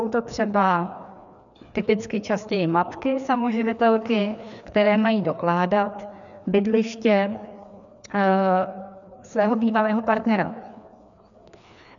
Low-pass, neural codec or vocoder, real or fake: 7.2 kHz; codec, 16 kHz, 2 kbps, FreqCodec, larger model; fake